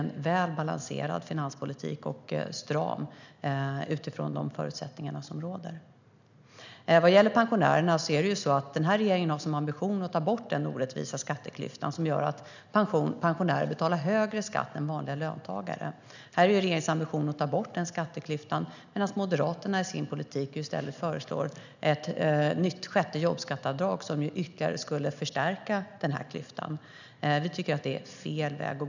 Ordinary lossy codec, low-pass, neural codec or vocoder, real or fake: none; 7.2 kHz; none; real